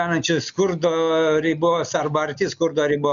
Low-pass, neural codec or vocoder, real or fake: 7.2 kHz; none; real